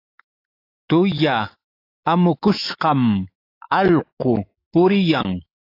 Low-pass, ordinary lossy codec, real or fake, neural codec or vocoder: 5.4 kHz; AAC, 32 kbps; fake; autoencoder, 48 kHz, 128 numbers a frame, DAC-VAE, trained on Japanese speech